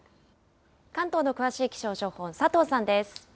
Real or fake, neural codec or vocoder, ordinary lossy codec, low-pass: real; none; none; none